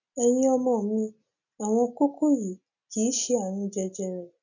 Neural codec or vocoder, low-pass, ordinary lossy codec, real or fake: none; 7.2 kHz; none; real